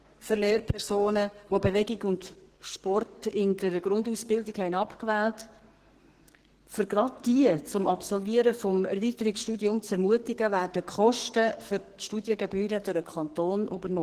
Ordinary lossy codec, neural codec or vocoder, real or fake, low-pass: Opus, 16 kbps; codec, 44.1 kHz, 2.6 kbps, SNAC; fake; 14.4 kHz